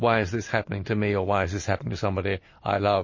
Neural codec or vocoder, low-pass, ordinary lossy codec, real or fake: none; 7.2 kHz; MP3, 32 kbps; real